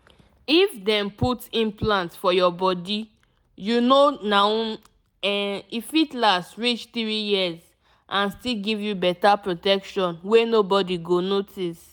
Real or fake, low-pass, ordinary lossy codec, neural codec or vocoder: real; none; none; none